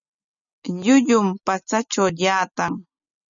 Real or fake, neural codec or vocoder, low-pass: real; none; 7.2 kHz